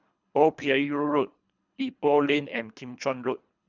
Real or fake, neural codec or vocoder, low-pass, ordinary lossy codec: fake; codec, 24 kHz, 3 kbps, HILCodec; 7.2 kHz; none